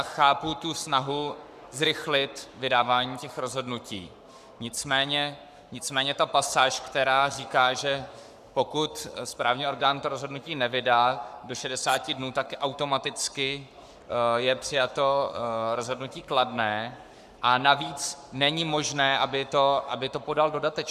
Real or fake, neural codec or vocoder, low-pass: fake; codec, 44.1 kHz, 7.8 kbps, Pupu-Codec; 14.4 kHz